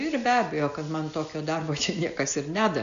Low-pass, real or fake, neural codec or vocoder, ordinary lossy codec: 7.2 kHz; real; none; AAC, 64 kbps